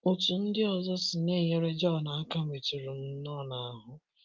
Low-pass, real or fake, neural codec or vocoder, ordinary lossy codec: 7.2 kHz; real; none; Opus, 32 kbps